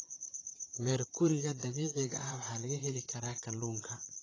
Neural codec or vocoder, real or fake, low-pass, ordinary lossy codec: vocoder, 44.1 kHz, 128 mel bands, Pupu-Vocoder; fake; 7.2 kHz; AAC, 32 kbps